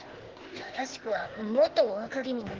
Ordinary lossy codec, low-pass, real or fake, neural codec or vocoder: Opus, 16 kbps; 7.2 kHz; fake; codec, 16 kHz, 0.8 kbps, ZipCodec